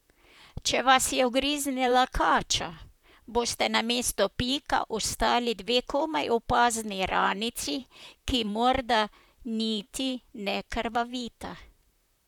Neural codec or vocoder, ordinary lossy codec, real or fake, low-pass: vocoder, 44.1 kHz, 128 mel bands, Pupu-Vocoder; none; fake; 19.8 kHz